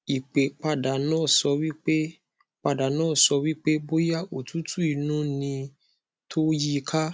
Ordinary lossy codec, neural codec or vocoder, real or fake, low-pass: none; none; real; none